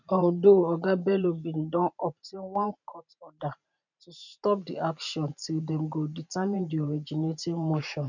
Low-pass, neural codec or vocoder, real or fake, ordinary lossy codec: 7.2 kHz; vocoder, 44.1 kHz, 128 mel bands every 512 samples, BigVGAN v2; fake; none